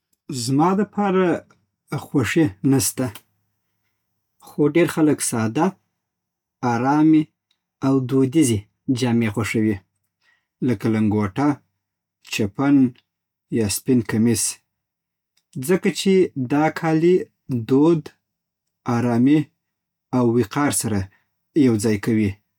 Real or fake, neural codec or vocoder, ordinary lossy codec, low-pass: real; none; none; 19.8 kHz